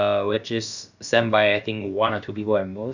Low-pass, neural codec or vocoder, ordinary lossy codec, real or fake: 7.2 kHz; codec, 16 kHz, about 1 kbps, DyCAST, with the encoder's durations; none; fake